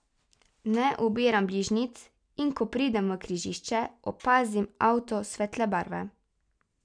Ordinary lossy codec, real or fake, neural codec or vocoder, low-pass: none; real; none; 9.9 kHz